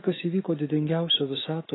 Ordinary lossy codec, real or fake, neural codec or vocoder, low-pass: AAC, 16 kbps; fake; autoencoder, 48 kHz, 128 numbers a frame, DAC-VAE, trained on Japanese speech; 7.2 kHz